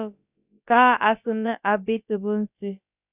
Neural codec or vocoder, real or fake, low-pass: codec, 16 kHz, about 1 kbps, DyCAST, with the encoder's durations; fake; 3.6 kHz